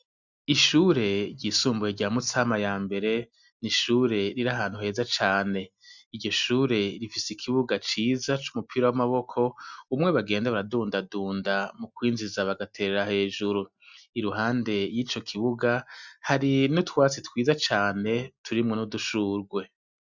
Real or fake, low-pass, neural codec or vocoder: real; 7.2 kHz; none